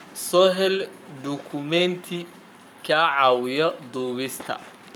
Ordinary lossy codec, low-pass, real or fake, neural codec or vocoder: none; 19.8 kHz; fake; codec, 44.1 kHz, 7.8 kbps, Pupu-Codec